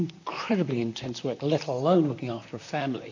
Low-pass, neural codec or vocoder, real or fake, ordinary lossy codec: 7.2 kHz; vocoder, 44.1 kHz, 128 mel bands, Pupu-Vocoder; fake; AAC, 48 kbps